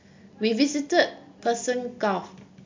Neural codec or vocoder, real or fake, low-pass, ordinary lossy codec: none; real; 7.2 kHz; MP3, 64 kbps